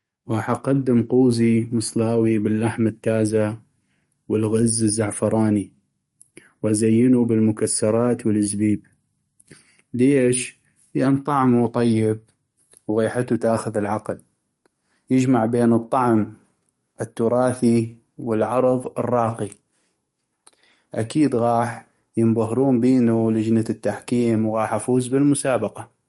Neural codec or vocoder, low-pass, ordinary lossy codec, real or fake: codec, 44.1 kHz, 7.8 kbps, DAC; 19.8 kHz; MP3, 48 kbps; fake